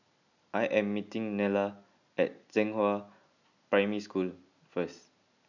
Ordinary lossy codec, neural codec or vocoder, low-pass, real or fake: none; none; 7.2 kHz; real